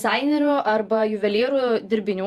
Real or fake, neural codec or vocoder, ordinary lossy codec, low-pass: fake; vocoder, 48 kHz, 128 mel bands, Vocos; Opus, 64 kbps; 14.4 kHz